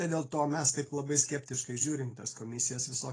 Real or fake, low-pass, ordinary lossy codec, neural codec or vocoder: real; 9.9 kHz; AAC, 32 kbps; none